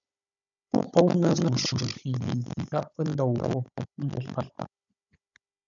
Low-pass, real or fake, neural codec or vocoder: 7.2 kHz; fake; codec, 16 kHz, 16 kbps, FunCodec, trained on Chinese and English, 50 frames a second